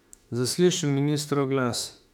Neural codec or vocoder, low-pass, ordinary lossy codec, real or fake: autoencoder, 48 kHz, 32 numbers a frame, DAC-VAE, trained on Japanese speech; 19.8 kHz; none; fake